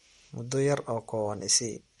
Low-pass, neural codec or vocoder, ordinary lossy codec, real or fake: 19.8 kHz; none; MP3, 48 kbps; real